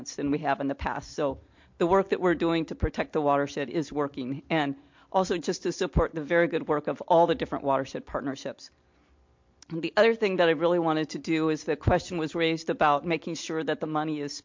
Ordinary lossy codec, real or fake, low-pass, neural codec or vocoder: MP3, 48 kbps; real; 7.2 kHz; none